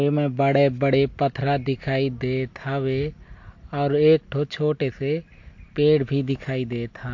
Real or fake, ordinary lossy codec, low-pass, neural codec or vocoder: real; MP3, 48 kbps; 7.2 kHz; none